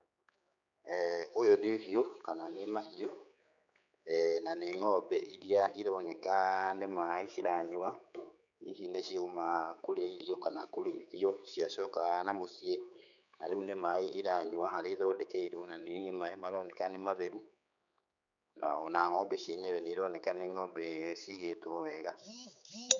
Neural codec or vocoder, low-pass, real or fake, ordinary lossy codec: codec, 16 kHz, 4 kbps, X-Codec, HuBERT features, trained on general audio; 7.2 kHz; fake; none